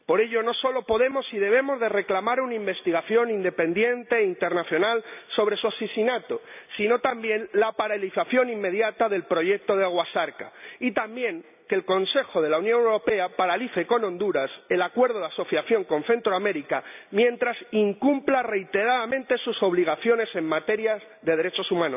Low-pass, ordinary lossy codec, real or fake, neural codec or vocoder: 3.6 kHz; none; real; none